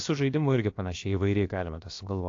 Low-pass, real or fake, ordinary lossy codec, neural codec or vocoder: 7.2 kHz; fake; AAC, 48 kbps; codec, 16 kHz, about 1 kbps, DyCAST, with the encoder's durations